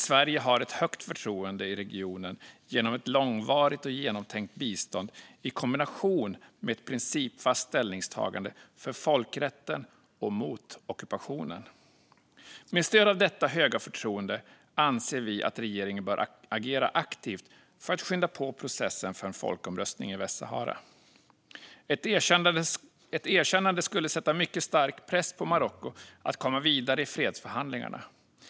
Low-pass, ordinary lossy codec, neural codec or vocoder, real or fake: none; none; none; real